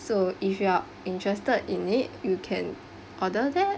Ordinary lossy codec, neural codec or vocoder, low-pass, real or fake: none; none; none; real